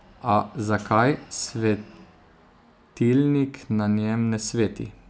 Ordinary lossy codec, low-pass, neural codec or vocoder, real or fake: none; none; none; real